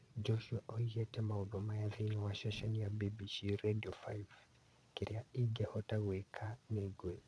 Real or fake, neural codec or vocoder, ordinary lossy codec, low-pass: fake; vocoder, 22.05 kHz, 80 mel bands, Vocos; none; none